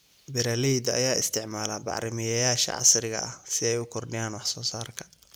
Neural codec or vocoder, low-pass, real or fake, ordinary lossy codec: none; none; real; none